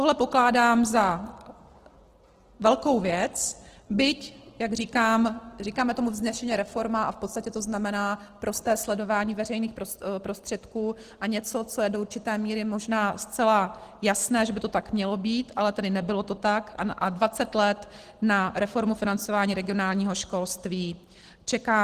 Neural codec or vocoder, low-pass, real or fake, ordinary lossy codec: none; 14.4 kHz; real; Opus, 16 kbps